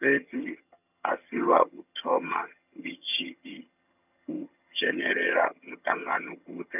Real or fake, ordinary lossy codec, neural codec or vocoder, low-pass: fake; none; vocoder, 22.05 kHz, 80 mel bands, HiFi-GAN; 3.6 kHz